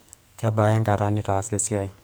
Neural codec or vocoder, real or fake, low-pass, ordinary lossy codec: codec, 44.1 kHz, 2.6 kbps, SNAC; fake; none; none